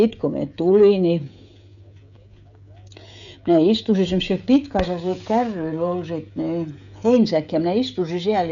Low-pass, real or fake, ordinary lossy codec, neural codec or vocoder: 7.2 kHz; fake; none; codec, 16 kHz, 16 kbps, FreqCodec, smaller model